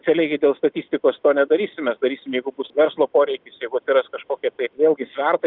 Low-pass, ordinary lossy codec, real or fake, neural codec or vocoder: 5.4 kHz; Opus, 64 kbps; real; none